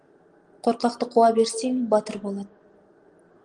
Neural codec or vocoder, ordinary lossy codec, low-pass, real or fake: none; Opus, 24 kbps; 9.9 kHz; real